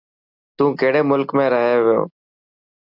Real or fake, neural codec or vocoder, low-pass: real; none; 5.4 kHz